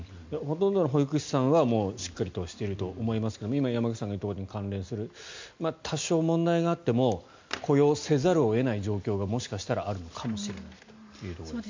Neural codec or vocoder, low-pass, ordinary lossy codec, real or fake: none; 7.2 kHz; MP3, 64 kbps; real